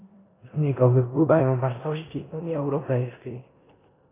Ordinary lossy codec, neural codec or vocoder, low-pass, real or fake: AAC, 16 kbps; codec, 16 kHz in and 24 kHz out, 0.9 kbps, LongCat-Audio-Codec, four codebook decoder; 3.6 kHz; fake